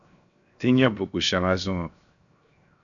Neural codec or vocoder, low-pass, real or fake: codec, 16 kHz, 0.7 kbps, FocalCodec; 7.2 kHz; fake